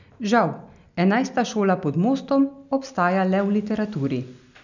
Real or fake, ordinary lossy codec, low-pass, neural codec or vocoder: real; none; 7.2 kHz; none